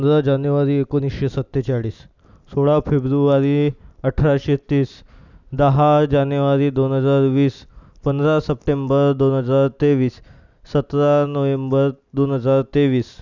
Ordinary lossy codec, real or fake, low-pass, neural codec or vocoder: none; real; 7.2 kHz; none